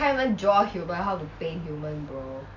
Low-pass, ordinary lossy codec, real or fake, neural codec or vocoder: 7.2 kHz; none; real; none